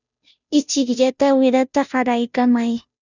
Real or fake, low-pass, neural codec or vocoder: fake; 7.2 kHz; codec, 16 kHz, 0.5 kbps, FunCodec, trained on Chinese and English, 25 frames a second